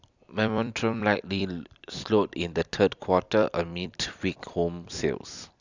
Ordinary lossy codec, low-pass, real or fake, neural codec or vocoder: Opus, 64 kbps; 7.2 kHz; fake; vocoder, 44.1 kHz, 128 mel bands every 256 samples, BigVGAN v2